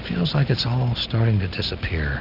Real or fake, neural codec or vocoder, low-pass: real; none; 5.4 kHz